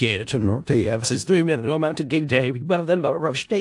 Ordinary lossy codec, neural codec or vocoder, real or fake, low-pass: AAC, 64 kbps; codec, 16 kHz in and 24 kHz out, 0.4 kbps, LongCat-Audio-Codec, four codebook decoder; fake; 10.8 kHz